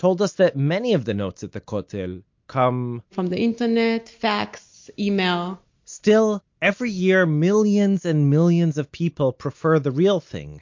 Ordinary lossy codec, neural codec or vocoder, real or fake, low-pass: MP3, 48 kbps; none; real; 7.2 kHz